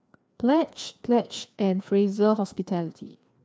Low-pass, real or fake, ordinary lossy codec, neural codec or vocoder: none; fake; none; codec, 16 kHz, 2 kbps, FreqCodec, larger model